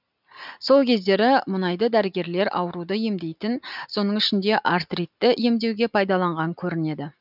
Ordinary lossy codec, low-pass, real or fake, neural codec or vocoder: none; 5.4 kHz; real; none